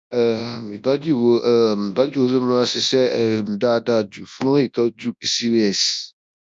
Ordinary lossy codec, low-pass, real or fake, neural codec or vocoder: none; none; fake; codec, 24 kHz, 0.9 kbps, WavTokenizer, large speech release